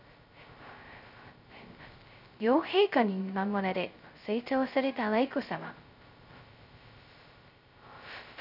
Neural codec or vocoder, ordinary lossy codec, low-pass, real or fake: codec, 16 kHz, 0.2 kbps, FocalCodec; none; 5.4 kHz; fake